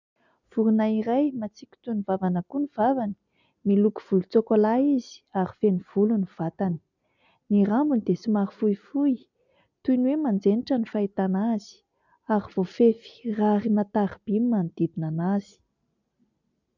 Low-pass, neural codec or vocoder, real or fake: 7.2 kHz; none; real